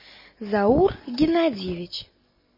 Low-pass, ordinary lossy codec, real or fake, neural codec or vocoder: 5.4 kHz; MP3, 24 kbps; real; none